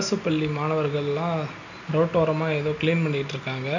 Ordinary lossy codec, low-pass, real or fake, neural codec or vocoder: none; 7.2 kHz; real; none